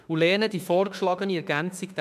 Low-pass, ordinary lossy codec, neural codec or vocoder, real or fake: 14.4 kHz; MP3, 96 kbps; autoencoder, 48 kHz, 32 numbers a frame, DAC-VAE, trained on Japanese speech; fake